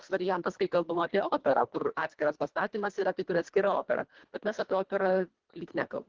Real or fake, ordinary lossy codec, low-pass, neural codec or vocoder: fake; Opus, 16 kbps; 7.2 kHz; codec, 24 kHz, 1.5 kbps, HILCodec